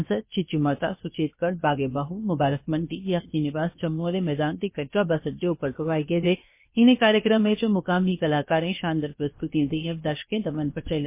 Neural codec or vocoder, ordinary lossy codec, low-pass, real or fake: codec, 16 kHz, 0.7 kbps, FocalCodec; MP3, 24 kbps; 3.6 kHz; fake